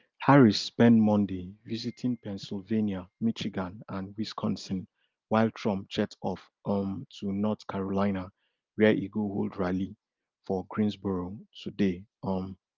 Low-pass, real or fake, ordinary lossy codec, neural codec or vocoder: 7.2 kHz; real; Opus, 32 kbps; none